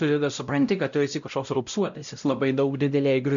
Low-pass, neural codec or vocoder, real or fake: 7.2 kHz; codec, 16 kHz, 0.5 kbps, X-Codec, WavLM features, trained on Multilingual LibriSpeech; fake